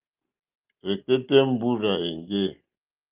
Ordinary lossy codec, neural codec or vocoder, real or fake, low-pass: Opus, 24 kbps; none; real; 3.6 kHz